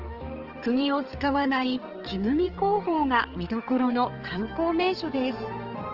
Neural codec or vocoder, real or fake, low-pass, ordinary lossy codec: codec, 16 kHz, 4 kbps, X-Codec, HuBERT features, trained on balanced general audio; fake; 5.4 kHz; Opus, 16 kbps